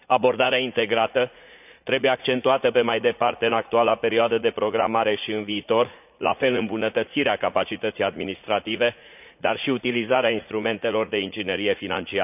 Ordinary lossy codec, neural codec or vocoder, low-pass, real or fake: none; vocoder, 44.1 kHz, 80 mel bands, Vocos; 3.6 kHz; fake